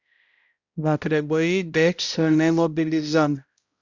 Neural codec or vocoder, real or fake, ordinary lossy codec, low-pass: codec, 16 kHz, 0.5 kbps, X-Codec, HuBERT features, trained on balanced general audio; fake; Opus, 64 kbps; 7.2 kHz